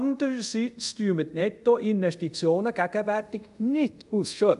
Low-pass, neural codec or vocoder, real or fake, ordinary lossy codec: 10.8 kHz; codec, 24 kHz, 0.5 kbps, DualCodec; fake; none